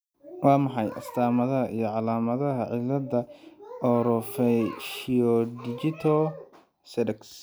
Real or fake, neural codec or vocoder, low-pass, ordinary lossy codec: real; none; none; none